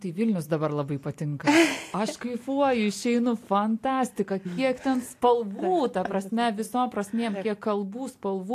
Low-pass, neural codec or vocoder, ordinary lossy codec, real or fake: 14.4 kHz; none; AAC, 64 kbps; real